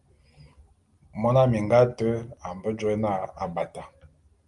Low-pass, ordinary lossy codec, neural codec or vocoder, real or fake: 10.8 kHz; Opus, 32 kbps; none; real